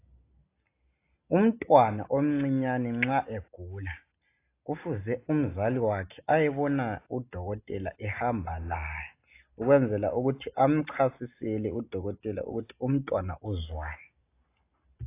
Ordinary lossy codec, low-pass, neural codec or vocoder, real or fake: AAC, 24 kbps; 3.6 kHz; none; real